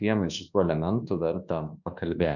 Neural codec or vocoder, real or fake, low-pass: codec, 24 kHz, 1.2 kbps, DualCodec; fake; 7.2 kHz